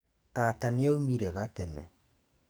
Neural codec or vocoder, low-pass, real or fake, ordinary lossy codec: codec, 44.1 kHz, 2.6 kbps, SNAC; none; fake; none